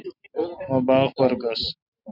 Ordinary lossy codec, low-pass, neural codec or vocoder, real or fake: Opus, 64 kbps; 5.4 kHz; none; real